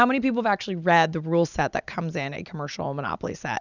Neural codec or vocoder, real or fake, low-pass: none; real; 7.2 kHz